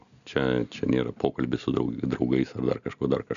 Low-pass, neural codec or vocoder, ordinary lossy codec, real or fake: 7.2 kHz; none; MP3, 96 kbps; real